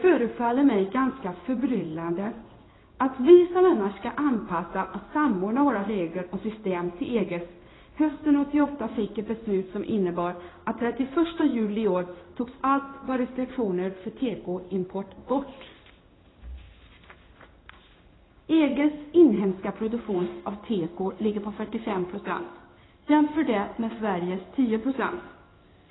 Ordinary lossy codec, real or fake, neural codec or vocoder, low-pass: AAC, 16 kbps; fake; codec, 16 kHz in and 24 kHz out, 1 kbps, XY-Tokenizer; 7.2 kHz